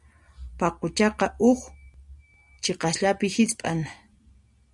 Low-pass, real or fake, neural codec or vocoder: 10.8 kHz; real; none